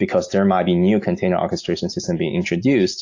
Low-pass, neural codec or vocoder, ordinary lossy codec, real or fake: 7.2 kHz; none; AAC, 48 kbps; real